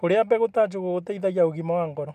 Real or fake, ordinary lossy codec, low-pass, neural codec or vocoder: real; none; 14.4 kHz; none